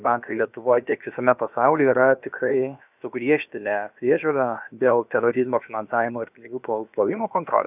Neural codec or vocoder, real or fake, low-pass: codec, 16 kHz, about 1 kbps, DyCAST, with the encoder's durations; fake; 3.6 kHz